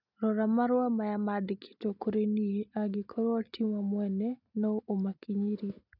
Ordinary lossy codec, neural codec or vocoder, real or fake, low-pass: none; none; real; 5.4 kHz